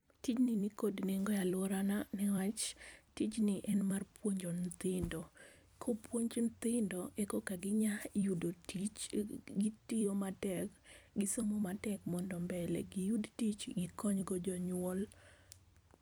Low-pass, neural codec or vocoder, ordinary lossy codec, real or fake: none; vocoder, 44.1 kHz, 128 mel bands every 256 samples, BigVGAN v2; none; fake